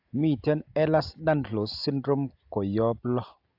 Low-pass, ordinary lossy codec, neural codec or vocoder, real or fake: 5.4 kHz; AAC, 48 kbps; none; real